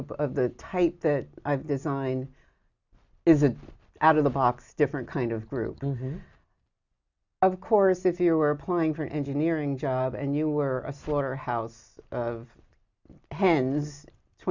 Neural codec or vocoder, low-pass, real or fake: none; 7.2 kHz; real